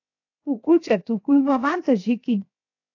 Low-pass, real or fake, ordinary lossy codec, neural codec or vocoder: 7.2 kHz; fake; AAC, 48 kbps; codec, 16 kHz, 0.7 kbps, FocalCodec